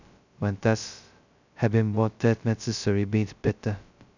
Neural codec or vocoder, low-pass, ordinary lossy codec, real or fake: codec, 16 kHz, 0.2 kbps, FocalCodec; 7.2 kHz; none; fake